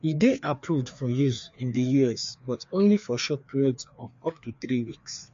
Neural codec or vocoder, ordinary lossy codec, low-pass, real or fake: codec, 16 kHz, 2 kbps, FreqCodec, larger model; MP3, 48 kbps; 7.2 kHz; fake